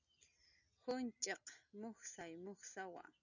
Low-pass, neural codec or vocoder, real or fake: 7.2 kHz; none; real